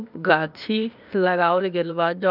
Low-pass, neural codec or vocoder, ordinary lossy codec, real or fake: 5.4 kHz; codec, 16 kHz, 0.8 kbps, ZipCodec; none; fake